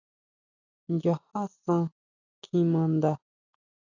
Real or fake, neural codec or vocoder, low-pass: real; none; 7.2 kHz